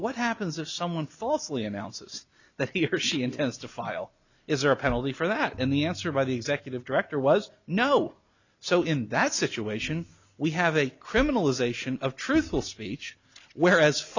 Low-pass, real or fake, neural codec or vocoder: 7.2 kHz; real; none